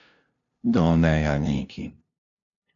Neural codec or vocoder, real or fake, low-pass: codec, 16 kHz, 0.5 kbps, FunCodec, trained on LibriTTS, 25 frames a second; fake; 7.2 kHz